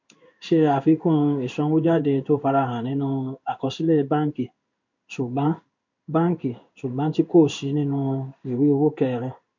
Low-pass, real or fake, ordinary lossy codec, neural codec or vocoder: 7.2 kHz; fake; MP3, 48 kbps; codec, 16 kHz in and 24 kHz out, 1 kbps, XY-Tokenizer